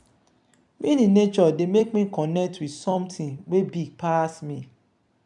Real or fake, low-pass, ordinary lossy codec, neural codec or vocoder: fake; 10.8 kHz; none; vocoder, 44.1 kHz, 128 mel bands every 256 samples, BigVGAN v2